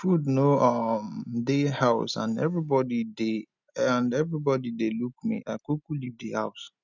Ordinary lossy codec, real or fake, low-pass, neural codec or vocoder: none; real; 7.2 kHz; none